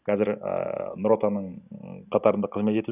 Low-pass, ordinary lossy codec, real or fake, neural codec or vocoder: 3.6 kHz; none; real; none